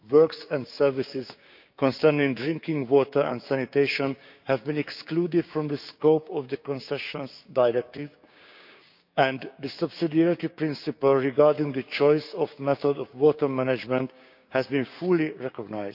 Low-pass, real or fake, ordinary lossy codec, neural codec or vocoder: 5.4 kHz; fake; none; codec, 16 kHz, 6 kbps, DAC